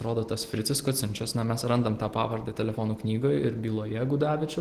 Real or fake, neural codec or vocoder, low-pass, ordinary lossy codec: real; none; 14.4 kHz; Opus, 16 kbps